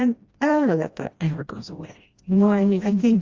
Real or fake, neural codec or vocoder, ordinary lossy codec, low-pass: fake; codec, 16 kHz, 1 kbps, FreqCodec, smaller model; Opus, 32 kbps; 7.2 kHz